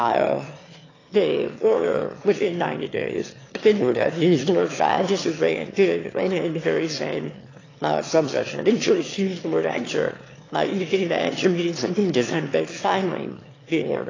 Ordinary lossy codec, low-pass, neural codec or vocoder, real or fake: AAC, 32 kbps; 7.2 kHz; autoencoder, 22.05 kHz, a latent of 192 numbers a frame, VITS, trained on one speaker; fake